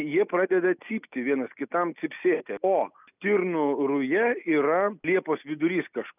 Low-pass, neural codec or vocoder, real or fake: 3.6 kHz; none; real